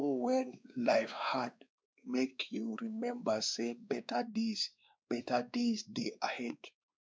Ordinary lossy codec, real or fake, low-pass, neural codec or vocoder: none; fake; none; codec, 16 kHz, 2 kbps, X-Codec, WavLM features, trained on Multilingual LibriSpeech